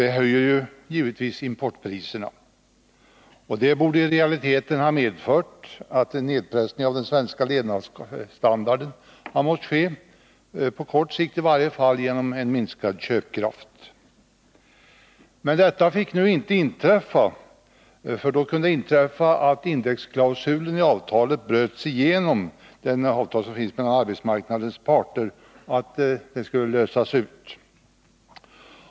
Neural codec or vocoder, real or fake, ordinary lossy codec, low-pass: none; real; none; none